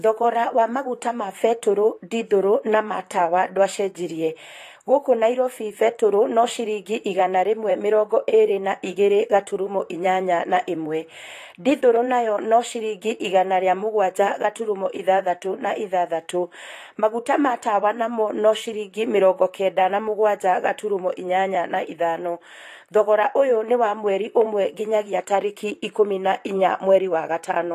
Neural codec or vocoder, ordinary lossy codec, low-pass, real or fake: vocoder, 44.1 kHz, 128 mel bands, Pupu-Vocoder; AAC, 48 kbps; 14.4 kHz; fake